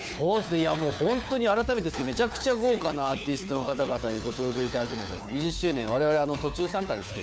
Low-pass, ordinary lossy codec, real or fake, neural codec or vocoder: none; none; fake; codec, 16 kHz, 4 kbps, FunCodec, trained on LibriTTS, 50 frames a second